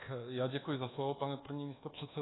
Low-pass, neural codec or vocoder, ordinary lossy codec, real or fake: 7.2 kHz; codec, 24 kHz, 1.2 kbps, DualCodec; AAC, 16 kbps; fake